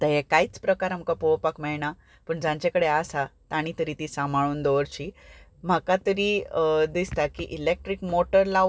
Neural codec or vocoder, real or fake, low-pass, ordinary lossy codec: none; real; none; none